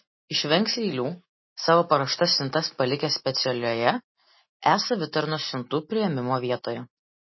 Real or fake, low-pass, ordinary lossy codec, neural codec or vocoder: real; 7.2 kHz; MP3, 24 kbps; none